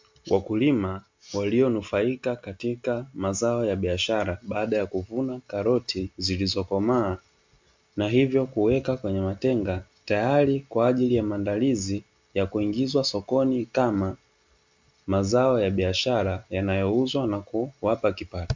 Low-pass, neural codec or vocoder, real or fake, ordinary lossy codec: 7.2 kHz; none; real; MP3, 64 kbps